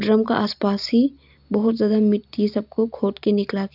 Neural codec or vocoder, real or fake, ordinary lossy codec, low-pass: none; real; none; 5.4 kHz